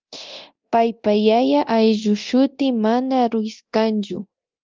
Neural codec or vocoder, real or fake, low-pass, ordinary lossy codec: codec, 24 kHz, 0.9 kbps, DualCodec; fake; 7.2 kHz; Opus, 32 kbps